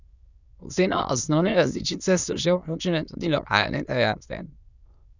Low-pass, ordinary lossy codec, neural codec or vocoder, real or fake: 7.2 kHz; none; autoencoder, 22.05 kHz, a latent of 192 numbers a frame, VITS, trained on many speakers; fake